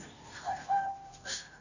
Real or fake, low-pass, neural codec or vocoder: fake; 7.2 kHz; codec, 16 kHz, 0.5 kbps, FunCodec, trained on Chinese and English, 25 frames a second